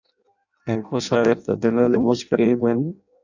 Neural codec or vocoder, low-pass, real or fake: codec, 16 kHz in and 24 kHz out, 0.6 kbps, FireRedTTS-2 codec; 7.2 kHz; fake